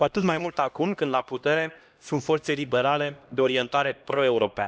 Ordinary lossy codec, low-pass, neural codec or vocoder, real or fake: none; none; codec, 16 kHz, 1 kbps, X-Codec, HuBERT features, trained on LibriSpeech; fake